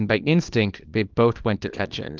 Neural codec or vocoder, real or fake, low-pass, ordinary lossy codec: codec, 24 kHz, 0.9 kbps, WavTokenizer, small release; fake; 7.2 kHz; Opus, 32 kbps